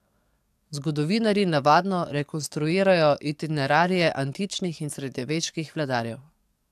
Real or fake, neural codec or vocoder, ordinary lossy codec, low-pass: fake; codec, 44.1 kHz, 7.8 kbps, DAC; AAC, 96 kbps; 14.4 kHz